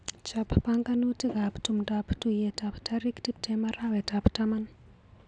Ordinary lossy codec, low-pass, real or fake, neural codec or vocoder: none; 9.9 kHz; real; none